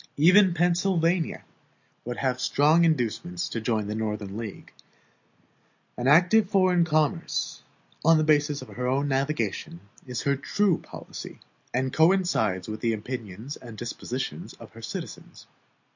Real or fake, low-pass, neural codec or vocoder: real; 7.2 kHz; none